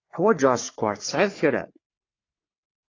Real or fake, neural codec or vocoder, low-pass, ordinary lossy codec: fake; codec, 44.1 kHz, 3.4 kbps, Pupu-Codec; 7.2 kHz; AAC, 32 kbps